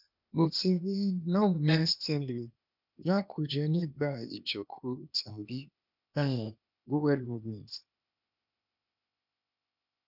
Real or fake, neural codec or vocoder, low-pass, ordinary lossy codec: fake; codec, 16 kHz, 0.8 kbps, ZipCodec; 5.4 kHz; none